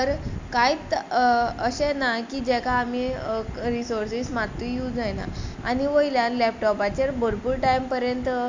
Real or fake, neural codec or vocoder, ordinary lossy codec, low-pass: real; none; MP3, 64 kbps; 7.2 kHz